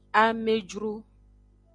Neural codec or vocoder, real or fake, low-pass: none; real; 9.9 kHz